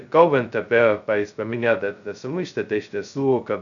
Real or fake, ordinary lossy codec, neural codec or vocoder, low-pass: fake; MP3, 96 kbps; codec, 16 kHz, 0.2 kbps, FocalCodec; 7.2 kHz